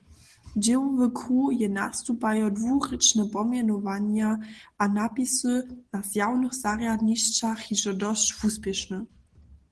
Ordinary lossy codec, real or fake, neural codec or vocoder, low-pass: Opus, 16 kbps; real; none; 10.8 kHz